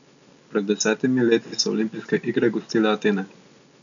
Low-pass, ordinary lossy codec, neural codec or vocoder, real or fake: 7.2 kHz; none; none; real